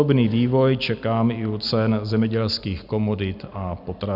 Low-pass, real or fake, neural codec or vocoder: 5.4 kHz; real; none